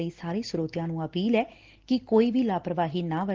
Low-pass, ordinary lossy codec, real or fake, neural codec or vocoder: 7.2 kHz; Opus, 16 kbps; real; none